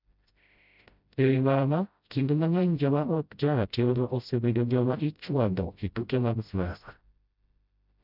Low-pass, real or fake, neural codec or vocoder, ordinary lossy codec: 5.4 kHz; fake; codec, 16 kHz, 0.5 kbps, FreqCodec, smaller model; none